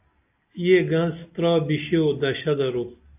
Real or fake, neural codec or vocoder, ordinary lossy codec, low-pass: real; none; AAC, 32 kbps; 3.6 kHz